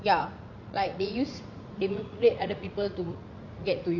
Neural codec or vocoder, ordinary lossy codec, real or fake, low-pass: vocoder, 44.1 kHz, 80 mel bands, Vocos; none; fake; 7.2 kHz